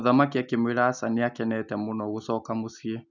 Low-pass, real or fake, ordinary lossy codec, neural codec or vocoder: 7.2 kHz; real; none; none